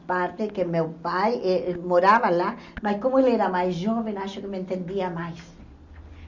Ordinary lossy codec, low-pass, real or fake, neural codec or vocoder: Opus, 64 kbps; 7.2 kHz; real; none